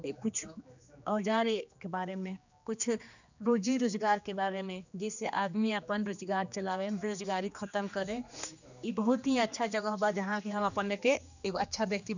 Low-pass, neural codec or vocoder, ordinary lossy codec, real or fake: 7.2 kHz; codec, 16 kHz, 2 kbps, X-Codec, HuBERT features, trained on general audio; none; fake